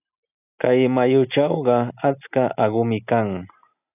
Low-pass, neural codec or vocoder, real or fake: 3.6 kHz; none; real